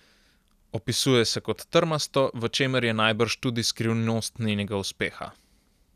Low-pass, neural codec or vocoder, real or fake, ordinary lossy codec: 14.4 kHz; none; real; none